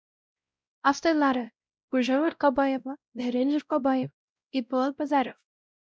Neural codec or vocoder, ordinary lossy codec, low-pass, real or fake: codec, 16 kHz, 0.5 kbps, X-Codec, WavLM features, trained on Multilingual LibriSpeech; none; none; fake